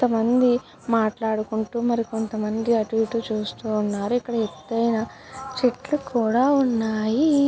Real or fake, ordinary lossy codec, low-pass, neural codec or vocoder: real; none; none; none